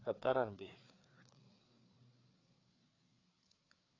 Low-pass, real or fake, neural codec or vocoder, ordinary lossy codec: 7.2 kHz; fake; codec, 16 kHz, 4 kbps, FreqCodec, larger model; AAC, 32 kbps